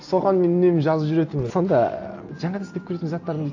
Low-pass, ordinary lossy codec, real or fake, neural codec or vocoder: 7.2 kHz; AAC, 48 kbps; real; none